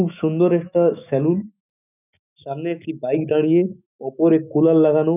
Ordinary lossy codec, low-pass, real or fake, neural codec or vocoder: none; 3.6 kHz; fake; autoencoder, 48 kHz, 128 numbers a frame, DAC-VAE, trained on Japanese speech